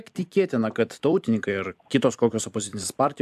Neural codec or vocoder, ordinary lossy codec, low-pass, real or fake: vocoder, 44.1 kHz, 128 mel bands every 256 samples, BigVGAN v2; AAC, 96 kbps; 14.4 kHz; fake